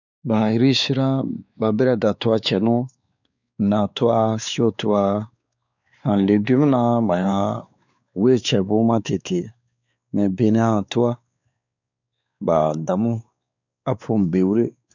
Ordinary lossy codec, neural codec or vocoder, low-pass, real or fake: none; codec, 16 kHz, 4 kbps, X-Codec, WavLM features, trained on Multilingual LibriSpeech; 7.2 kHz; fake